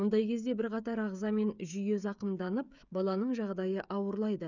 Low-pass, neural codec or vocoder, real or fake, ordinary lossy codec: 7.2 kHz; codec, 16 kHz, 16 kbps, FreqCodec, smaller model; fake; none